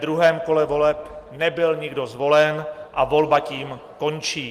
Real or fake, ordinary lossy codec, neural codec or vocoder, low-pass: real; Opus, 24 kbps; none; 14.4 kHz